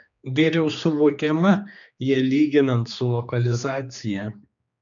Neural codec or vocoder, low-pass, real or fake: codec, 16 kHz, 2 kbps, X-Codec, HuBERT features, trained on general audio; 7.2 kHz; fake